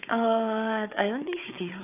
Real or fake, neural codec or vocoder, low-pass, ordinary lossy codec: fake; codec, 16 kHz, 4 kbps, FunCodec, trained on Chinese and English, 50 frames a second; 3.6 kHz; none